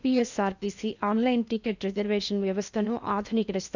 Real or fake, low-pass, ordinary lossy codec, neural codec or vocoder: fake; 7.2 kHz; none; codec, 16 kHz in and 24 kHz out, 0.8 kbps, FocalCodec, streaming, 65536 codes